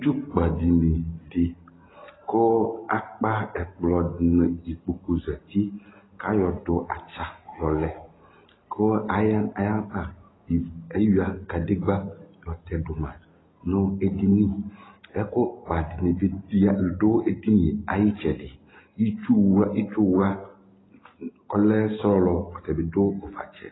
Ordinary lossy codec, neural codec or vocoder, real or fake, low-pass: AAC, 16 kbps; none; real; 7.2 kHz